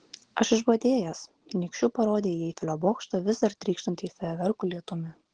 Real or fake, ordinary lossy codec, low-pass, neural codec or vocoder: real; Opus, 16 kbps; 9.9 kHz; none